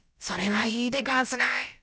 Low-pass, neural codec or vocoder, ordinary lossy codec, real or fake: none; codec, 16 kHz, about 1 kbps, DyCAST, with the encoder's durations; none; fake